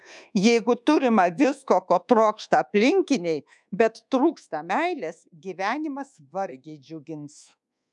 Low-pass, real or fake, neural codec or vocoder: 10.8 kHz; fake; codec, 24 kHz, 1.2 kbps, DualCodec